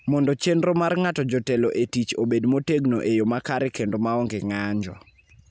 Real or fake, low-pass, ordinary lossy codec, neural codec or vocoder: real; none; none; none